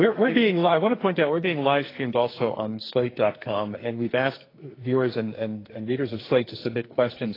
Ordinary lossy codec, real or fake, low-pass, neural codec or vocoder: AAC, 24 kbps; fake; 5.4 kHz; codec, 44.1 kHz, 2.6 kbps, SNAC